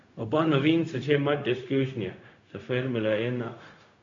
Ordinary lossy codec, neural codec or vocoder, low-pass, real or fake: AAC, 48 kbps; codec, 16 kHz, 0.4 kbps, LongCat-Audio-Codec; 7.2 kHz; fake